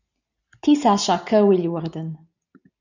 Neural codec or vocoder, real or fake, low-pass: none; real; 7.2 kHz